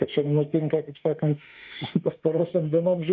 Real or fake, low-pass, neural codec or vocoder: fake; 7.2 kHz; autoencoder, 48 kHz, 32 numbers a frame, DAC-VAE, trained on Japanese speech